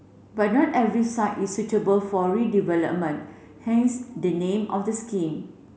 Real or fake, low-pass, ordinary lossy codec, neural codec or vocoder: real; none; none; none